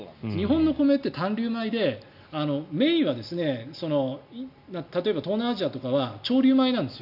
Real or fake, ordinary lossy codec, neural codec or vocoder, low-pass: real; none; none; 5.4 kHz